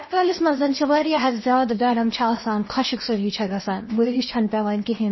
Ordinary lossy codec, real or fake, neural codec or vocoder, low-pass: MP3, 24 kbps; fake; codec, 16 kHz in and 24 kHz out, 0.8 kbps, FocalCodec, streaming, 65536 codes; 7.2 kHz